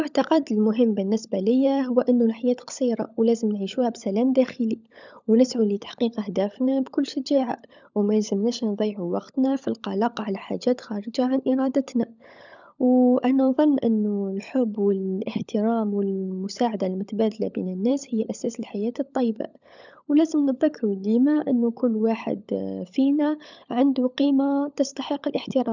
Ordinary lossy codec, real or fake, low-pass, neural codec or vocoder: none; fake; 7.2 kHz; codec, 16 kHz, 16 kbps, FunCodec, trained on LibriTTS, 50 frames a second